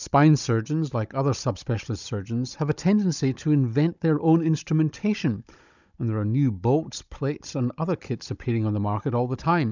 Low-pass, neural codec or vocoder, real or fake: 7.2 kHz; codec, 16 kHz, 16 kbps, FunCodec, trained on Chinese and English, 50 frames a second; fake